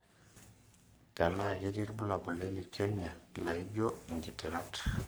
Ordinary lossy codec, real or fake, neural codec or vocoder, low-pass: none; fake; codec, 44.1 kHz, 3.4 kbps, Pupu-Codec; none